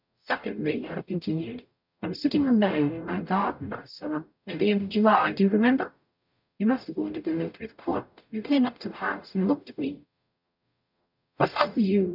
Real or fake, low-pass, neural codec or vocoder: fake; 5.4 kHz; codec, 44.1 kHz, 0.9 kbps, DAC